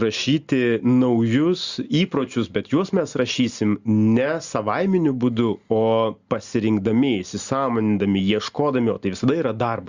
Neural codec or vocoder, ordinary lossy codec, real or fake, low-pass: none; Opus, 64 kbps; real; 7.2 kHz